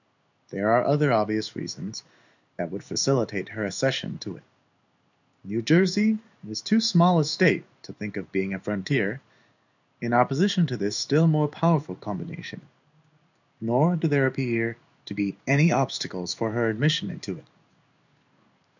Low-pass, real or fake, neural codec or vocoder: 7.2 kHz; fake; codec, 16 kHz in and 24 kHz out, 1 kbps, XY-Tokenizer